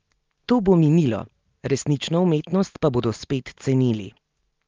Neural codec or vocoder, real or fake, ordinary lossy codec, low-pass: none; real; Opus, 32 kbps; 7.2 kHz